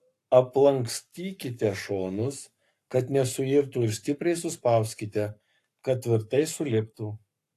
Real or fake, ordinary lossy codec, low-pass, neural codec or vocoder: fake; AAC, 64 kbps; 14.4 kHz; codec, 44.1 kHz, 7.8 kbps, Pupu-Codec